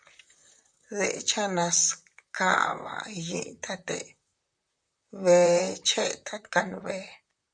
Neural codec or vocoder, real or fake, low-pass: vocoder, 44.1 kHz, 128 mel bands, Pupu-Vocoder; fake; 9.9 kHz